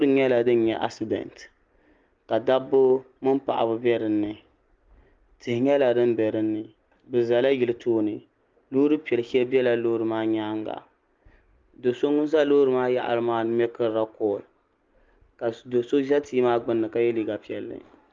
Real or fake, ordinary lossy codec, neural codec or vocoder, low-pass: real; Opus, 32 kbps; none; 7.2 kHz